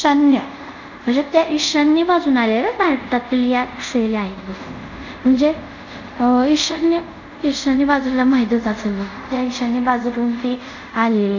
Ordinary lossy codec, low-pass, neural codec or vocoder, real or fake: none; 7.2 kHz; codec, 24 kHz, 0.5 kbps, DualCodec; fake